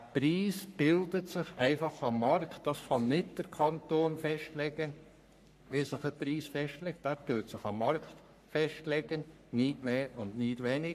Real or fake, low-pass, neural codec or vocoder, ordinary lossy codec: fake; 14.4 kHz; codec, 44.1 kHz, 3.4 kbps, Pupu-Codec; none